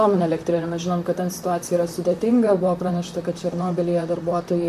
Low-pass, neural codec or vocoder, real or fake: 14.4 kHz; vocoder, 44.1 kHz, 128 mel bands, Pupu-Vocoder; fake